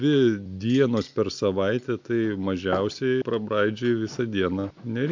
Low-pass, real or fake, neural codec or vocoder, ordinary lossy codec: 7.2 kHz; real; none; MP3, 64 kbps